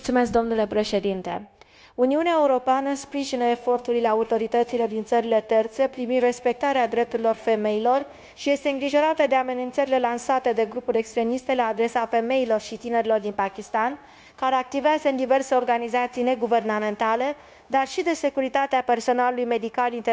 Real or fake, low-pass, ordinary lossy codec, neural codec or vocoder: fake; none; none; codec, 16 kHz, 0.9 kbps, LongCat-Audio-Codec